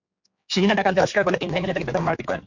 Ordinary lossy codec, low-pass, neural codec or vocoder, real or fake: MP3, 48 kbps; 7.2 kHz; codec, 16 kHz, 4 kbps, X-Codec, HuBERT features, trained on general audio; fake